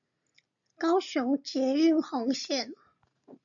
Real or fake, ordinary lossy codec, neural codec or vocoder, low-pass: real; MP3, 64 kbps; none; 7.2 kHz